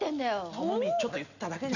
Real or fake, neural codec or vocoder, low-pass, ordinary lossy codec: real; none; 7.2 kHz; none